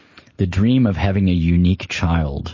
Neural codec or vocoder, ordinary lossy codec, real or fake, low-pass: none; MP3, 32 kbps; real; 7.2 kHz